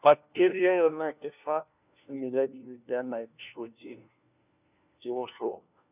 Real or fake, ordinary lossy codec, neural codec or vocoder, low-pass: fake; none; codec, 16 kHz, 1 kbps, FunCodec, trained on LibriTTS, 50 frames a second; 3.6 kHz